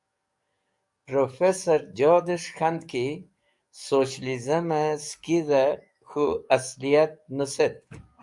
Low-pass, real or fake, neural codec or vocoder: 10.8 kHz; fake; codec, 44.1 kHz, 7.8 kbps, DAC